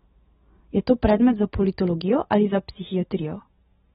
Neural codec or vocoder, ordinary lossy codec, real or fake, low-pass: none; AAC, 16 kbps; real; 19.8 kHz